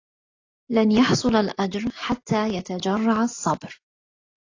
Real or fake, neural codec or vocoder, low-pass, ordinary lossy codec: real; none; 7.2 kHz; AAC, 32 kbps